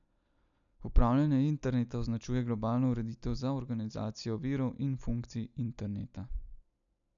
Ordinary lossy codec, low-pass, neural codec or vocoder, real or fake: none; 7.2 kHz; none; real